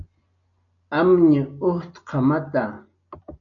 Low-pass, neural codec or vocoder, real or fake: 7.2 kHz; none; real